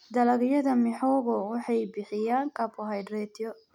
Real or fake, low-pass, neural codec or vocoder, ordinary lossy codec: real; 19.8 kHz; none; none